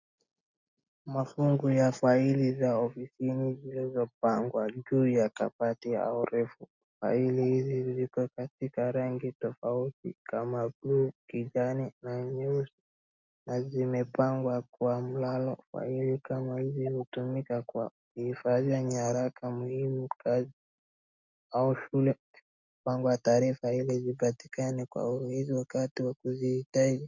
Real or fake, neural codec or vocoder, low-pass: real; none; 7.2 kHz